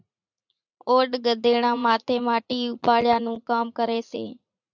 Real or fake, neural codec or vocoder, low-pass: fake; vocoder, 44.1 kHz, 80 mel bands, Vocos; 7.2 kHz